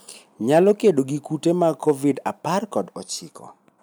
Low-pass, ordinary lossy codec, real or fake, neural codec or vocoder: none; none; real; none